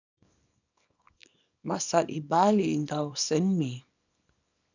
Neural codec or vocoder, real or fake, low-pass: codec, 24 kHz, 0.9 kbps, WavTokenizer, small release; fake; 7.2 kHz